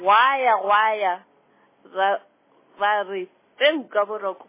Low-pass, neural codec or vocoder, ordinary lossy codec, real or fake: 3.6 kHz; none; MP3, 16 kbps; real